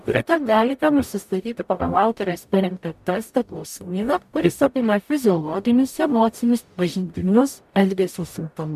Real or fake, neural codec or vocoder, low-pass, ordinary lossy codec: fake; codec, 44.1 kHz, 0.9 kbps, DAC; 14.4 kHz; MP3, 96 kbps